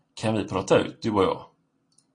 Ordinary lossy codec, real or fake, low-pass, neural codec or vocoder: AAC, 32 kbps; real; 9.9 kHz; none